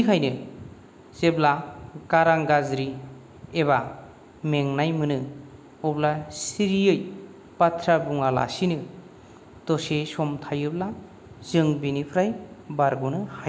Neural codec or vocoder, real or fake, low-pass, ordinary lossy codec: none; real; none; none